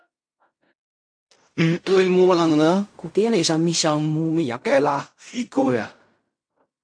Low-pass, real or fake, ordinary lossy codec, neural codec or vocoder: 9.9 kHz; fake; MP3, 64 kbps; codec, 16 kHz in and 24 kHz out, 0.4 kbps, LongCat-Audio-Codec, fine tuned four codebook decoder